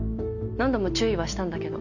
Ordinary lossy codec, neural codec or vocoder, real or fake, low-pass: none; none; real; 7.2 kHz